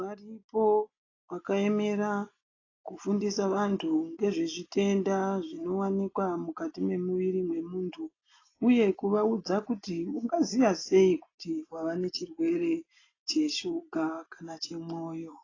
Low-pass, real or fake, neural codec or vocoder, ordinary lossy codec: 7.2 kHz; real; none; AAC, 32 kbps